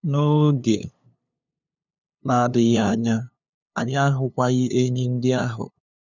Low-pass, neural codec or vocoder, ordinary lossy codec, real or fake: 7.2 kHz; codec, 16 kHz, 2 kbps, FunCodec, trained on LibriTTS, 25 frames a second; none; fake